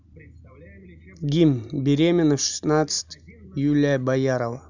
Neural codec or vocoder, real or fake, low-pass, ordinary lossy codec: none; real; 7.2 kHz; none